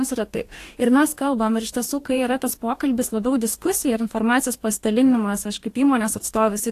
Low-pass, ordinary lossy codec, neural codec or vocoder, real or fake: 14.4 kHz; AAC, 64 kbps; codec, 44.1 kHz, 2.6 kbps, DAC; fake